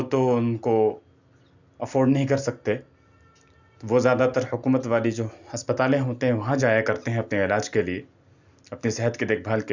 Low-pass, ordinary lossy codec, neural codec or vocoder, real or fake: 7.2 kHz; none; none; real